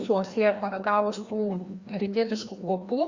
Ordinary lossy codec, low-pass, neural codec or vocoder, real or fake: Opus, 64 kbps; 7.2 kHz; codec, 16 kHz, 1 kbps, FreqCodec, larger model; fake